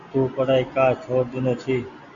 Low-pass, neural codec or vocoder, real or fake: 7.2 kHz; none; real